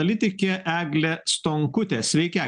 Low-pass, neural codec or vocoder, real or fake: 9.9 kHz; none; real